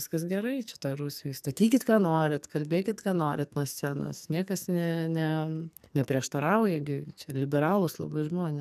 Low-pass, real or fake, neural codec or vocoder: 14.4 kHz; fake; codec, 44.1 kHz, 2.6 kbps, SNAC